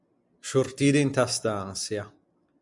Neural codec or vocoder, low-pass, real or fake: none; 10.8 kHz; real